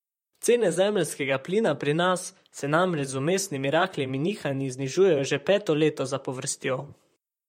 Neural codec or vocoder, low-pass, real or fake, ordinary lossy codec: vocoder, 44.1 kHz, 128 mel bands, Pupu-Vocoder; 19.8 kHz; fake; MP3, 64 kbps